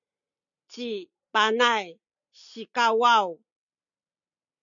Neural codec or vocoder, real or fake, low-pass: none; real; 7.2 kHz